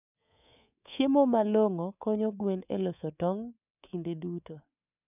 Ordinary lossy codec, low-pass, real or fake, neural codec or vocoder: none; 3.6 kHz; fake; codec, 24 kHz, 3.1 kbps, DualCodec